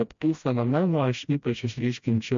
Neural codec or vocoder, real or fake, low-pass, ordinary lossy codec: codec, 16 kHz, 1 kbps, FreqCodec, smaller model; fake; 7.2 kHz; MP3, 48 kbps